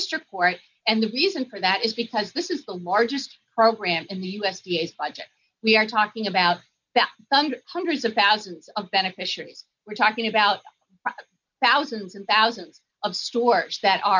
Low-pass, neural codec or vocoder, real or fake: 7.2 kHz; none; real